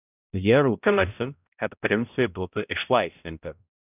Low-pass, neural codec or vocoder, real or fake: 3.6 kHz; codec, 16 kHz, 0.5 kbps, X-Codec, HuBERT features, trained on balanced general audio; fake